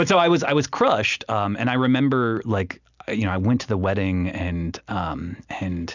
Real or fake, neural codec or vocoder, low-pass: real; none; 7.2 kHz